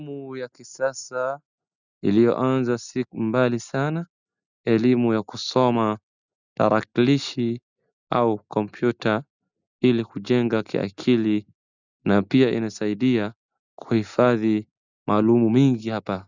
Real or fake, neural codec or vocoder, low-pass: real; none; 7.2 kHz